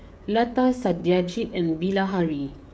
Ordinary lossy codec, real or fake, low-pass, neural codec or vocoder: none; fake; none; codec, 16 kHz, 16 kbps, FreqCodec, smaller model